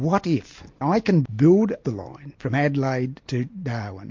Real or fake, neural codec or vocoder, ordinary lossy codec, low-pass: real; none; MP3, 48 kbps; 7.2 kHz